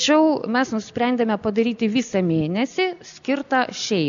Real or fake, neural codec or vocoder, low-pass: real; none; 7.2 kHz